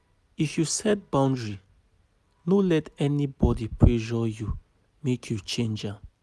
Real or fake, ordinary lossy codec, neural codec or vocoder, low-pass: real; none; none; none